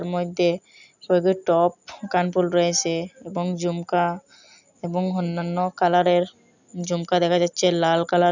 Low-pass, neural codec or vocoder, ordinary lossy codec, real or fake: 7.2 kHz; none; none; real